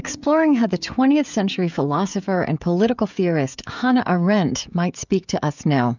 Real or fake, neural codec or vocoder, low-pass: fake; codec, 16 kHz, 16 kbps, FreqCodec, smaller model; 7.2 kHz